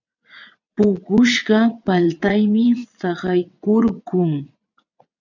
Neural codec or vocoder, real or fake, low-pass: vocoder, 22.05 kHz, 80 mel bands, WaveNeXt; fake; 7.2 kHz